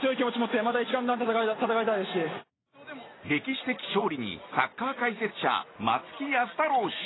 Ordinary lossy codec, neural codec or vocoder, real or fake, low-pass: AAC, 16 kbps; none; real; 7.2 kHz